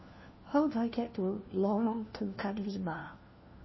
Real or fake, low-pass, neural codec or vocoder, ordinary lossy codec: fake; 7.2 kHz; codec, 16 kHz, 1 kbps, FunCodec, trained on LibriTTS, 50 frames a second; MP3, 24 kbps